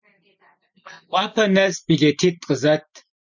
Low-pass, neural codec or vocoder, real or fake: 7.2 kHz; none; real